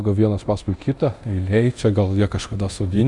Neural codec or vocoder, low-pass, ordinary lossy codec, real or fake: codec, 24 kHz, 0.9 kbps, DualCodec; 10.8 kHz; Opus, 64 kbps; fake